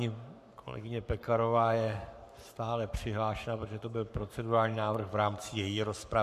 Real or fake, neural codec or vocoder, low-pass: fake; codec, 44.1 kHz, 7.8 kbps, Pupu-Codec; 14.4 kHz